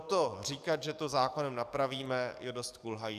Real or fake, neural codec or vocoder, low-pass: fake; codec, 44.1 kHz, 7.8 kbps, DAC; 14.4 kHz